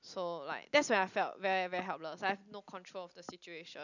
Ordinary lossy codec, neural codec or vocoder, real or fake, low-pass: none; none; real; 7.2 kHz